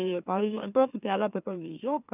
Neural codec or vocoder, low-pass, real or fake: autoencoder, 44.1 kHz, a latent of 192 numbers a frame, MeloTTS; 3.6 kHz; fake